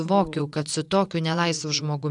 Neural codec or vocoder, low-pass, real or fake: vocoder, 24 kHz, 100 mel bands, Vocos; 10.8 kHz; fake